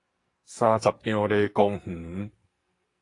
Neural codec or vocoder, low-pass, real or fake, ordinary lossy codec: codec, 44.1 kHz, 2.6 kbps, SNAC; 10.8 kHz; fake; AAC, 48 kbps